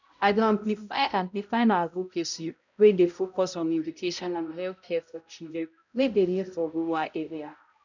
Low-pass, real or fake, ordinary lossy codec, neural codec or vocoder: 7.2 kHz; fake; none; codec, 16 kHz, 0.5 kbps, X-Codec, HuBERT features, trained on balanced general audio